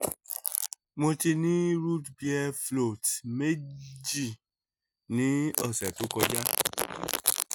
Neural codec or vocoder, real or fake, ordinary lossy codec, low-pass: none; real; none; none